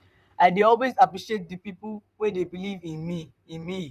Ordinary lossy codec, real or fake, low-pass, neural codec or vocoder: none; fake; 14.4 kHz; vocoder, 44.1 kHz, 128 mel bands, Pupu-Vocoder